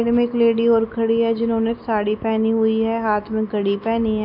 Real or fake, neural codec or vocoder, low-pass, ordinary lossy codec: real; none; 5.4 kHz; none